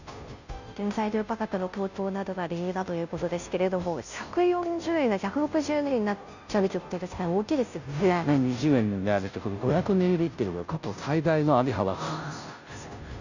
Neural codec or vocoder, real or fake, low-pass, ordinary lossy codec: codec, 16 kHz, 0.5 kbps, FunCodec, trained on Chinese and English, 25 frames a second; fake; 7.2 kHz; none